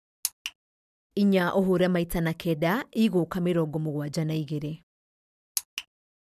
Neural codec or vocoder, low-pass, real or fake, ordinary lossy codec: none; 14.4 kHz; real; none